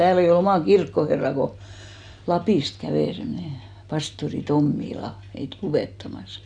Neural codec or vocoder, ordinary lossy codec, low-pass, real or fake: none; none; 9.9 kHz; real